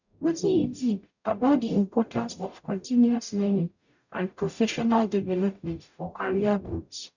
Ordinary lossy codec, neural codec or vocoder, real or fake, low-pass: none; codec, 44.1 kHz, 0.9 kbps, DAC; fake; 7.2 kHz